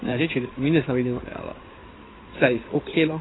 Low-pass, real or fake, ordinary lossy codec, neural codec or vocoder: 7.2 kHz; fake; AAC, 16 kbps; codec, 16 kHz in and 24 kHz out, 2.2 kbps, FireRedTTS-2 codec